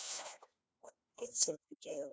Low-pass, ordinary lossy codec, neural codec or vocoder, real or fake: none; none; codec, 16 kHz, 1 kbps, FunCodec, trained on LibriTTS, 50 frames a second; fake